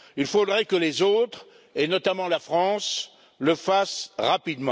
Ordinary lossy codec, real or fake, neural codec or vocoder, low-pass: none; real; none; none